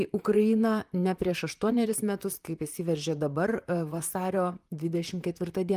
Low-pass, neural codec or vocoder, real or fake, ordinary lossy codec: 14.4 kHz; vocoder, 44.1 kHz, 128 mel bands, Pupu-Vocoder; fake; Opus, 32 kbps